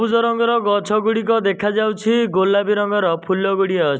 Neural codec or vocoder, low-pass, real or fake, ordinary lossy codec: none; none; real; none